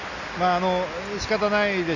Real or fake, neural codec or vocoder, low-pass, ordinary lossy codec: real; none; 7.2 kHz; none